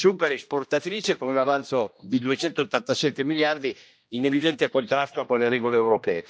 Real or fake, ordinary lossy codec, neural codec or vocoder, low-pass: fake; none; codec, 16 kHz, 1 kbps, X-Codec, HuBERT features, trained on general audio; none